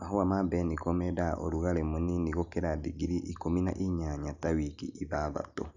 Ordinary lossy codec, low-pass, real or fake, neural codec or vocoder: none; 7.2 kHz; real; none